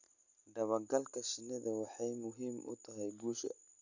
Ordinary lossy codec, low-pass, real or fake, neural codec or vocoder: none; 7.2 kHz; real; none